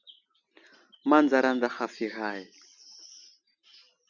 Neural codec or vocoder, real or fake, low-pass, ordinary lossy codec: none; real; 7.2 kHz; Opus, 64 kbps